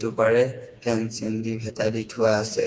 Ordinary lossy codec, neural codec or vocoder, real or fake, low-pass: none; codec, 16 kHz, 2 kbps, FreqCodec, smaller model; fake; none